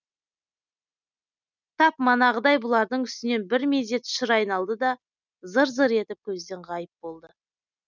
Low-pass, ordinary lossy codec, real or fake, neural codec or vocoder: 7.2 kHz; none; real; none